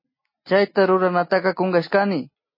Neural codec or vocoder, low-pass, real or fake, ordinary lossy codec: none; 5.4 kHz; real; MP3, 24 kbps